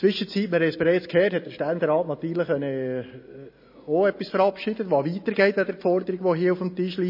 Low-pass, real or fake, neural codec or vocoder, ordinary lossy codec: 5.4 kHz; real; none; MP3, 24 kbps